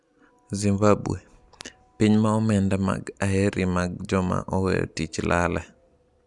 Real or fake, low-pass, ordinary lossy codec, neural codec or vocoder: real; 10.8 kHz; Opus, 64 kbps; none